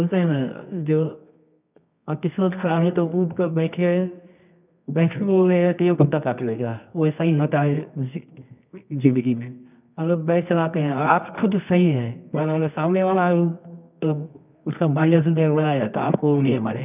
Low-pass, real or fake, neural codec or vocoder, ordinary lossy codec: 3.6 kHz; fake; codec, 24 kHz, 0.9 kbps, WavTokenizer, medium music audio release; none